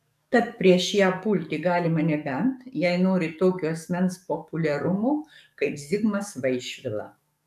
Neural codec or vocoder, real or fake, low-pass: codec, 44.1 kHz, 7.8 kbps, DAC; fake; 14.4 kHz